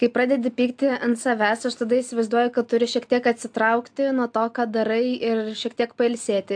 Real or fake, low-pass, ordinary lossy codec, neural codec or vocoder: real; 9.9 kHz; AAC, 64 kbps; none